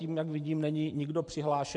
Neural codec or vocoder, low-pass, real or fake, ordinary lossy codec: vocoder, 44.1 kHz, 128 mel bands every 512 samples, BigVGAN v2; 10.8 kHz; fake; MP3, 64 kbps